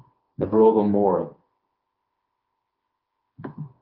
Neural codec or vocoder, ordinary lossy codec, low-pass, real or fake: codec, 16 kHz, 4 kbps, FreqCodec, smaller model; Opus, 32 kbps; 5.4 kHz; fake